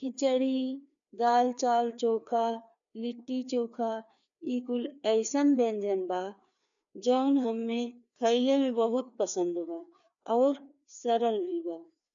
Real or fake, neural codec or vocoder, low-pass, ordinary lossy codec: fake; codec, 16 kHz, 2 kbps, FreqCodec, larger model; 7.2 kHz; none